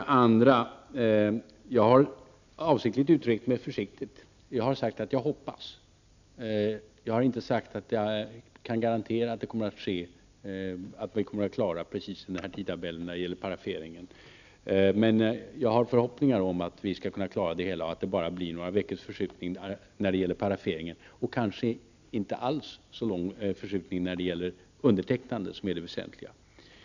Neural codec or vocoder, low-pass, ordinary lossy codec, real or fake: none; 7.2 kHz; none; real